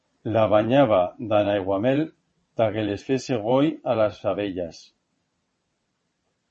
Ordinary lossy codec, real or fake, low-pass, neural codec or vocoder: MP3, 32 kbps; fake; 9.9 kHz; vocoder, 22.05 kHz, 80 mel bands, WaveNeXt